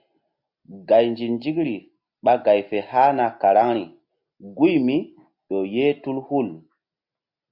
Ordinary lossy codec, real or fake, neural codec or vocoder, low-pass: AAC, 48 kbps; real; none; 5.4 kHz